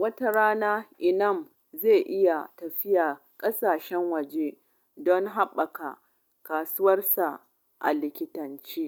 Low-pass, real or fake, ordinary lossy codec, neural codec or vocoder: 19.8 kHz; real; none; none